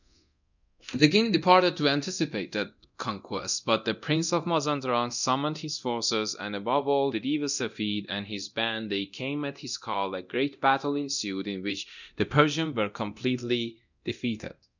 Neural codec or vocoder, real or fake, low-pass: codec, 24 kHz, 0.9 kbps, DualCodec; fake; 7.2 kHz